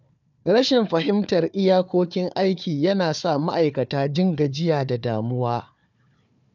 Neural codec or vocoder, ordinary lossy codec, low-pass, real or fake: codec, 16 kHz, 4 kbps, FunCodec, trained on Chinese and English, 50 frames a second; none; 7.2 kHz; fake